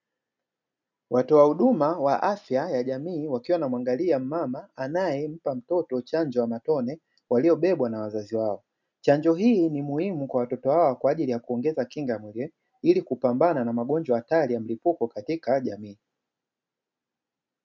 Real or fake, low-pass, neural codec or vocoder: real; 7.2 kHz; none